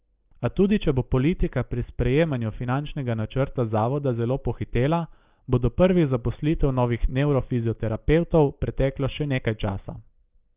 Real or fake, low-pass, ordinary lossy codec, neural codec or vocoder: real; 3.6 kHz; Opus, 24 kbps; none